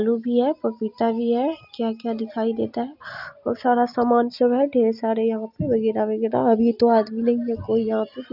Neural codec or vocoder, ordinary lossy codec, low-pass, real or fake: none; none; 5.4 kHz; real